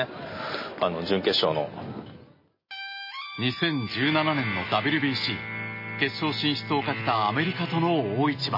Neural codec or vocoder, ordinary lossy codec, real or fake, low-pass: none; MP3, 24 kbps; real; 5.4 kHz